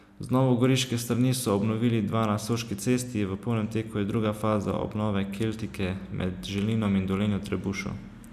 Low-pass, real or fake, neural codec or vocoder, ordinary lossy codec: 14.4 kHz; real; none; none